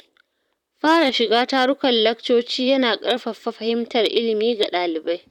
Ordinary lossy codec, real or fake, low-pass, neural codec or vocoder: none; fake; 19.8 kHz; vocoder, 44.1 kHz, 128 mel bands, Pupu-Vocoder